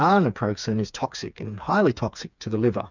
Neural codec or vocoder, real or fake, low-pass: codec, 16 kHz, 4 kbps, FreqCodec, smaller model; fake; 7.2 kHz